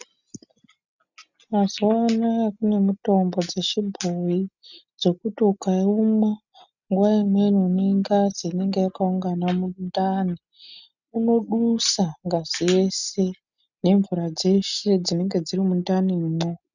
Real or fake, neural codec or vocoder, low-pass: real; none; 7.2 kHz